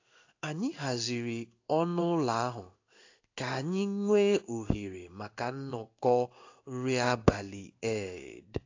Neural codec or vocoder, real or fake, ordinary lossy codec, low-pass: codec, 16 kHz in and 24 kHz out, 1 kbps, XY-Tokenizer; fake; AAC, 48 kbps; 7.2 kHz